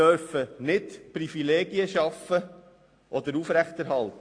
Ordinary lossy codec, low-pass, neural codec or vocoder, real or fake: AAC, 48 kbps; 9.9 kHz; none; real